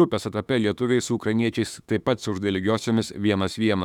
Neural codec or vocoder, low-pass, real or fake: autoencoder, 48 kHz, 32 numbers a frame, DAC-VAE, trained on Japanese speech; 19.8 kHz; fake